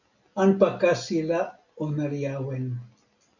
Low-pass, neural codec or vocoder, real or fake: 7.2 kHz; none; real